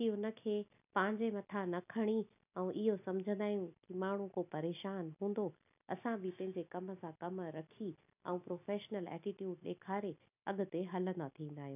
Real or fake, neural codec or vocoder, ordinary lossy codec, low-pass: real; none; none; 3.6 kHz